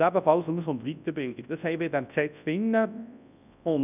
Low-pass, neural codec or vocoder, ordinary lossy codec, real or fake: 3.6 kHz; codec, 24 kHz, 0.9 kbps, WavTokenizer, large speech release; none; fake